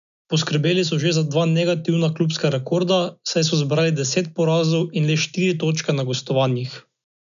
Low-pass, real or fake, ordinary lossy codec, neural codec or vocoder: 7.2 kHz; real; none; none